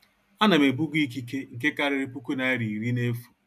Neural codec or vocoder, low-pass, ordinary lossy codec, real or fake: none; 14.4 kHz; AAC, 96 kbps; real